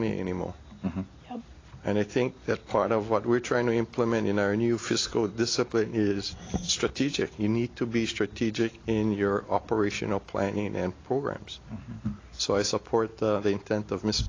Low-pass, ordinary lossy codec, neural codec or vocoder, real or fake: 7.2 kHz; AAC, 32 kbps; none; real